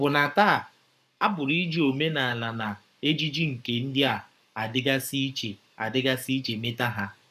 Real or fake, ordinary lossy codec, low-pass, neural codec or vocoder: fake; none; 14.4 kHz; codec, 44.1 kHz, 7.8 kbps, Pupu-Codec